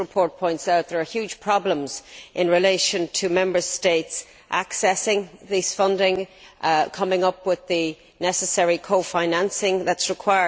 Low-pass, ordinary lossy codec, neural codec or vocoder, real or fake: none; none; none; real